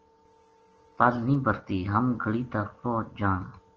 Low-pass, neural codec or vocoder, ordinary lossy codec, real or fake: 7.2 kHz; none; Opus, 16 kbps; real